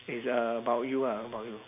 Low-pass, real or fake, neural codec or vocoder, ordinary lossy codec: 3.6 kHz; real; none; none